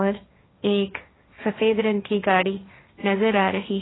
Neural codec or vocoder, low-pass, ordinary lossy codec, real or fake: codec, 16 kHz, 1.1 kbps, Voila-Tokenizer; 7.2 kHz; AAC, 16 kbps; fake